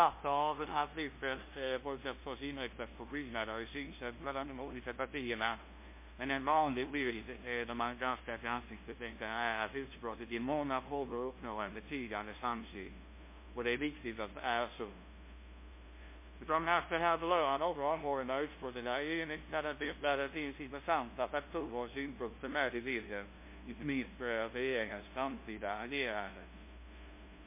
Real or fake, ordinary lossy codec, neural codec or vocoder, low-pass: fake; MP3, 24 kbps; codec, 16 kHz, 0.5 kbps, FunCodec, trained on LibriTTS, 25 frames a second; 3.6 kHz